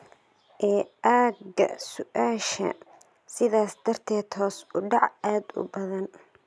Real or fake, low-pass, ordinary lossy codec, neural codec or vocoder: real; none; none; none